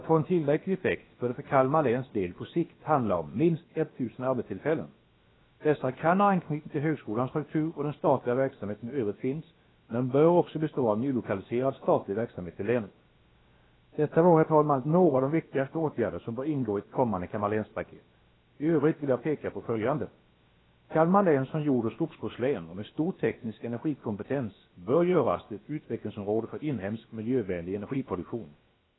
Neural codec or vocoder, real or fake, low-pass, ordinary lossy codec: codec, 16 kHz, about 1 kbps, DyCAST, with the encoder's durations; fake; 7.2 kHz; AAC, 16 kbps